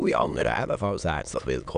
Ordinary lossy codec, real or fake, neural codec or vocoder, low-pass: none; fake; autoencoder, 22.05 kHz, a latent of 192 numbers a frame, VITS, trained on many speakers; 9.9 kHz